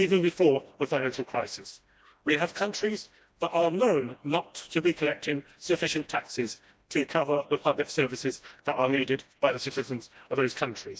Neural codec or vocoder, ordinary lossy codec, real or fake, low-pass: codec, 16 kHz, 1 kbps, FreqCodec, smaller model; none; fake; none